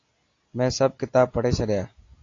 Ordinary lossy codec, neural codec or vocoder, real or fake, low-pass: AAC, 32 kbps; none; real; 7.2 kHz